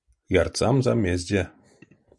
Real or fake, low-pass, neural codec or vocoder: real; 10.8 kHz; none